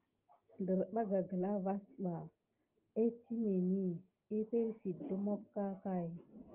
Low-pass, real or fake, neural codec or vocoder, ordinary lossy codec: 3.6 kHz; real; none; Opus, 24 kbps